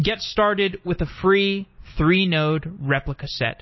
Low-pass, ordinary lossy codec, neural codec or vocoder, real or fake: 7.2 kHz; MP3, 24 kbps; none; real